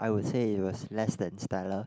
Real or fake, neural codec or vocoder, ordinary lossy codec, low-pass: real; none; none; none